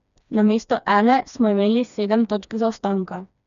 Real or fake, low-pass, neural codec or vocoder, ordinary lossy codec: fake; 7.2 kHz; codec, 16 kHz, 2 kbps, FreqCodec, smaller model; none